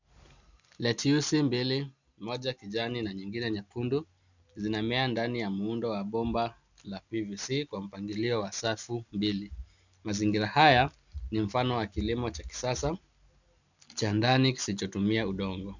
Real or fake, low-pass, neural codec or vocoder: real; 7.2 kHz; none